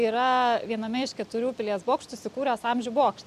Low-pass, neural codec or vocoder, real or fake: 14.4 kHz; none; real